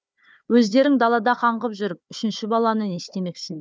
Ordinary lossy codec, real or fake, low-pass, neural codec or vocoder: none; fake; none; codec, 16 kHz, 4 kbps, FunCodec, trained on Chinese and English, 50 frames a second